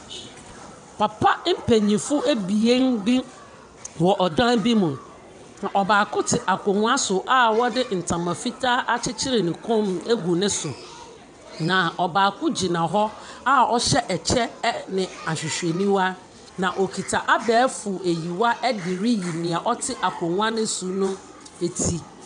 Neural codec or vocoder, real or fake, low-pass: vocoder, 22.05 kHz, 80 mel bands, WaveNeXt; fake; 9.9 kHz